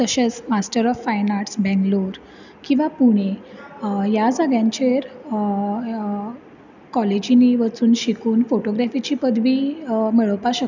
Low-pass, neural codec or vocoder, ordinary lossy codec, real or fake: 7.2 kHz; none; none; real